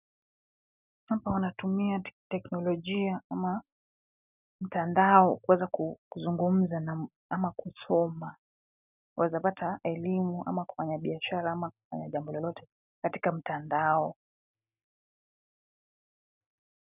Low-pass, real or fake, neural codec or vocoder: 3.6 kHz; real; none